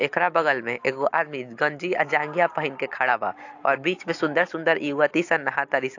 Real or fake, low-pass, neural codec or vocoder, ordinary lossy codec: real; 7.2 kHz; none; AAC, 48 kbps